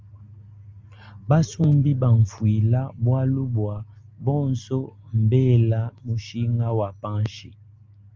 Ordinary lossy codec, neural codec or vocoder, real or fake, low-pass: Opus, 32 kbps; none; real; 7.2 kHz